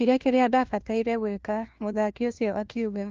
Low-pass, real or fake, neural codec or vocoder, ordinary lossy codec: 7.2 kHz; fake; codec, 16 kHz, 1 kbps, FunCodec, trained on LibriTTS, 50 frames a second; Opus, 32 kbps